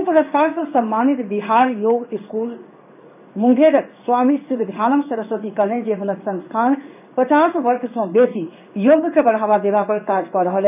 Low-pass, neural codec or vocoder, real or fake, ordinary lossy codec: 3.6 kHz; codec, 16 kHz in and 24 kHz out, 1 kbps, XY-Tokenizer; fake; none